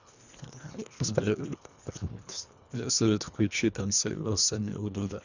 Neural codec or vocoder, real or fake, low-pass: codec, 24 kHz, 1.5 kbps, HILCodec; fake; 7.2 kHz